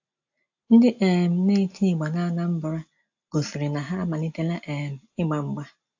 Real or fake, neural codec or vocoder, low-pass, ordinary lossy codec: real; none; 7.2 kHz; AAC, 48 kbps